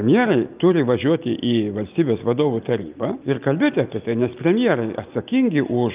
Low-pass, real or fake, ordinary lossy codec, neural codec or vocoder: 3.6 kHz; real; Opus, 24 kbps; none